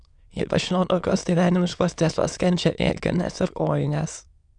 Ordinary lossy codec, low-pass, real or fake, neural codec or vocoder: Opus, 64 kbps; 9.9 kHz; fake; autoencoder, 22.05 kHz, a latent of 192 numbers a frame, VITS, trained on many speakers